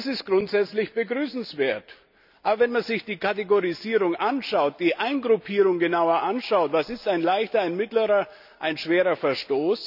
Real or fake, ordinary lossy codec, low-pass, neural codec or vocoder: real; none; 5.4 kHz; none